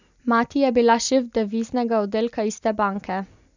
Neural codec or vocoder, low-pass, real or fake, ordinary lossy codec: none; 7.2 kHz; real; none